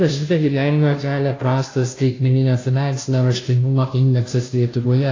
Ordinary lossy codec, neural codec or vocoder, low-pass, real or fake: AAC, 32 kbps; codec, 16 kHz, 0.5 kbps, FunCodec, trained on Chinese and English, 25 frames a second; 7.2 kHz; fake